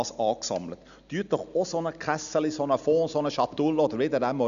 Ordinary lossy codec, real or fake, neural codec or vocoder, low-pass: none; real; none; 7.2 kHz